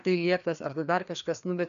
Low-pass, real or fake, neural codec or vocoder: 7.2 kHz; fake; codec, 16 kHz, 2 kbps, FreqCodec, larger model